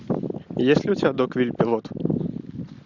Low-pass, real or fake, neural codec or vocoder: 7.2 kHz; real; none